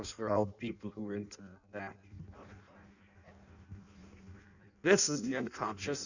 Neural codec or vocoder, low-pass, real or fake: codec, 16 kHz in and 24 kHz out, 0.6 kbps, FireRedTTS-2 codec; 7.2 kHz; fake